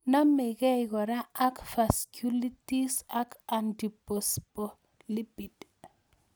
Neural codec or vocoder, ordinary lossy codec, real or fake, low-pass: none; none; real; none